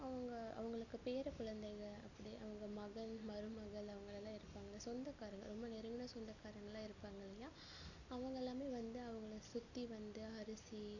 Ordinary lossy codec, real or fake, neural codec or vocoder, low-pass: none; real; none; 7.2 kHz